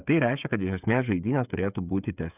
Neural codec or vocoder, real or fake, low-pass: codec, 16 kHz, 8 kbps, FreqCodec, smaller model; fake; 3.6 kHz